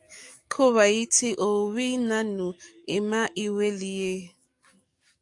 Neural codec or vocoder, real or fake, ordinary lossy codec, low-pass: codec, 44.1 kHz, 7.8 kbps, DAC; fake; AAC, 64 kbps; 10.8 kHz